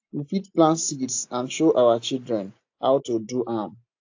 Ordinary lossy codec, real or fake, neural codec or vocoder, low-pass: AAC, 48 kbps; real; none; 7.2 kHz